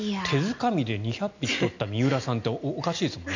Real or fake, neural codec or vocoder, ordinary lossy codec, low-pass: real; none; none; 7.2 kHz